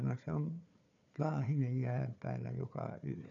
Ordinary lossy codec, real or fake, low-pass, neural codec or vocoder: none; fake; 7.2 kHz; codec, 16 kHz, 4 kbps, FunCodec, trained on Chinese and English, 50 frames a second